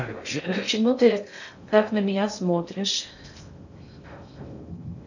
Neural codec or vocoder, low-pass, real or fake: codec, 16 kHz in and 24 kHz out, 0.6 kbps, FocalCodec, streaming, 2048 codes; 7.2 kHz; fake